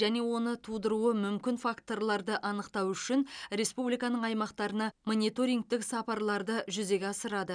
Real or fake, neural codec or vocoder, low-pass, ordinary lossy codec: real; none; 9.9 kHz; none